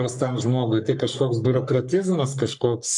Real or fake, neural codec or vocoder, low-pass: fake; codec, 44.1 kHz, 3.4 kbps, Pupu-Codec; 10.8 kHz